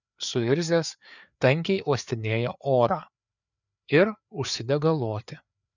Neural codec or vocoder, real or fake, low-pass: codec, 16 kHz, 4 kbps, FreqCodec, larger model; fake; 7.2 kHz